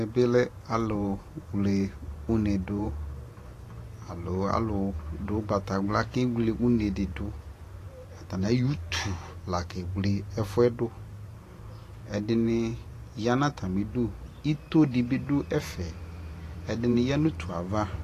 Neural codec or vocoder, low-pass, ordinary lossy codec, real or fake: vocoder, 44.1 kHz, 128 mel bands every 512 samples, BigVGAN v2; 14.4 kHz; AAC, 48 kbps; fake